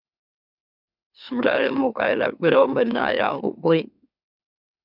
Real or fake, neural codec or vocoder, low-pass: fake; autoencoder, 44.1 kHz, a latent of 192 numbers a frame, MeloTTS; 5.4 kHz